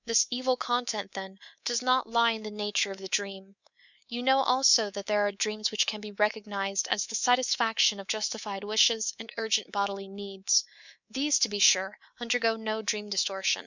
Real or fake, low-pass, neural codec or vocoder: fake; 7.2 kHz; codec, 24 kHz, 3.1 kbps, DualCodec